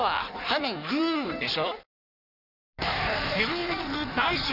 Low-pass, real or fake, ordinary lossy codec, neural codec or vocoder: 5.4 kHz; fake; none; codec, 16 kHz in and 24 kHz out, 1.1 kbps, FireRedTTS-2 codec